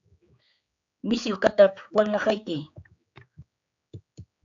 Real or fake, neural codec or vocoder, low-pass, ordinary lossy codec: fake; codec, 16 kHz, 4 kbps, X-Codec, HuBERT features, trained on general audio; 7.2 kHz; AAC, 64 kbps